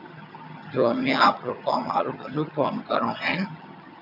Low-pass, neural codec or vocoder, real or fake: 5.4 kHz; vocoder, 22.05 kHz, 80 mel bands, HiFi-GAN; fake